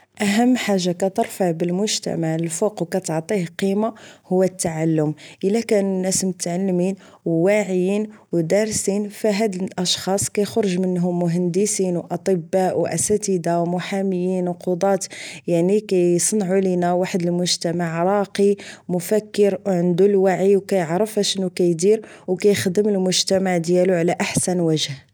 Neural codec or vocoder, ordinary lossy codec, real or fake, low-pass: none; none; real; none